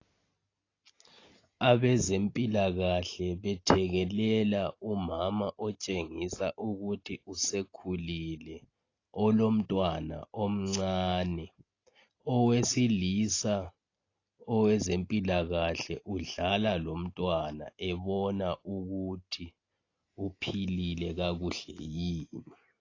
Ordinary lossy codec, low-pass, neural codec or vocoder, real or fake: AAC, 32 kbps; 7.2 kHz; none; real